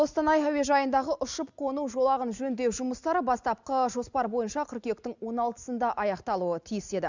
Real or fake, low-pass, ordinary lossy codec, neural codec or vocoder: real; 7.2 kHz; Opus, 64 kbps; none